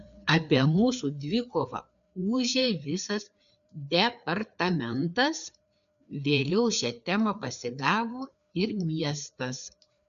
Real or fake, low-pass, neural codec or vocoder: fake; 7.2 kHz; codec, 16 kHz, 4 kbps, FreqCodec, larger model